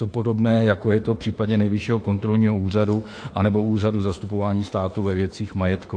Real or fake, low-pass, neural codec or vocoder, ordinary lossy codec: fake; 9.9 kHz; autoencoder, 48 kHz, 32 numbers a frame, DAC-VAE, trained on Japanese speech; AAC, 48 kbps